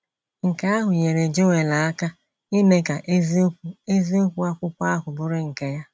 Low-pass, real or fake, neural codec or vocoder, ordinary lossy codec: none; real; none; none